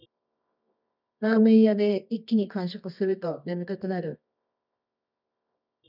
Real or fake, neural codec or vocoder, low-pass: fake; codec, 24 kHz, 0.9 kbps, WavTokenizer, medium music audio release; 5.4 kHz